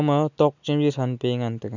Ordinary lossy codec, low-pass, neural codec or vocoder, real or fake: none; 7.2 kHz; none; real